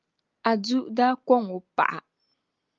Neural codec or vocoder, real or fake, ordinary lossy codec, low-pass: none; real; Opus, 32 kbps; 7.2 kHz